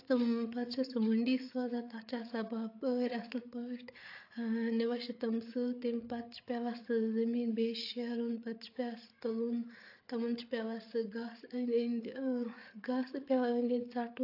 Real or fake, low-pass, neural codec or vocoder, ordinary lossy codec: fake; 5.4 kHz; codec, 16 kHz, 8 kbps, FreqCodec, larger model; AAC, 32 kbps